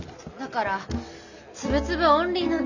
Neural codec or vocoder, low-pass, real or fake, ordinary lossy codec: none; 7.2 kHz; real; none